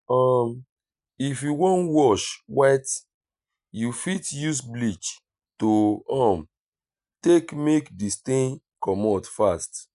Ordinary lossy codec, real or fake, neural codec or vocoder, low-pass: none; real; none; 10.8 kHz